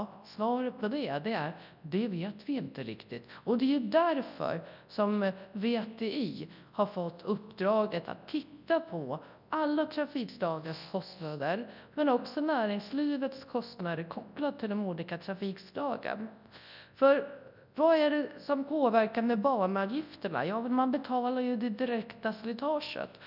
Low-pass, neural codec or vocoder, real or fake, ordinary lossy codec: 5.4 kHz; codec, 24 kHz, 0.9 kbps, WavTokenizer, large speech release; fake; none